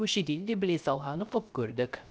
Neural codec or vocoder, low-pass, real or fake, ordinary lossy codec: codec, 16 kHz, 0.3 kbps, FocalCodec; none; fake; none